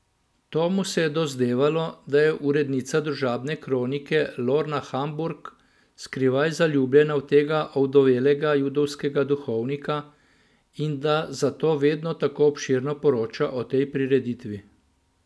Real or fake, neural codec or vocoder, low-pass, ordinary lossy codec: real; none; none; none